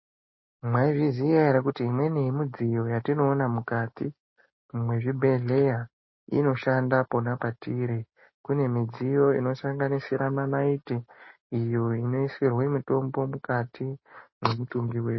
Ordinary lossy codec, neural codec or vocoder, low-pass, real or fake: MP3, 24 kbps; none; 7.2 kHz; real